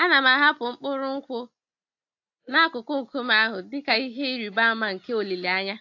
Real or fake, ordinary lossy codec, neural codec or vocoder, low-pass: real; AAC, 48 kbps; none; 7.2 kHz